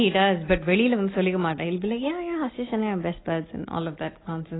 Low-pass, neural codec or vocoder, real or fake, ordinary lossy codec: 7.2 kHz; none; real; AAC, 16 kbps